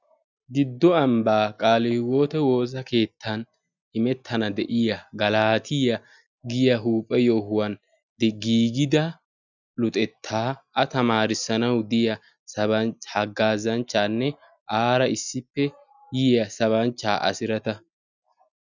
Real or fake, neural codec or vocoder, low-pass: real; none; 7.2 kHz